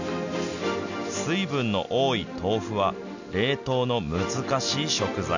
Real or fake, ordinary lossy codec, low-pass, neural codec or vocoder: real; none; 7.2 kHz; none